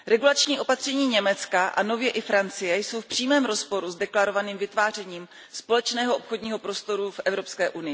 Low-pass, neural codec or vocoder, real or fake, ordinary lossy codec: none; none; real; none